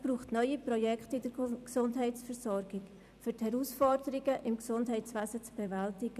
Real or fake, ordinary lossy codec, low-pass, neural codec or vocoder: fake; none; 14.4 kHz; vocoder, 44.1 kHz, 128 mel bands every 256 samples, BigVGAN v2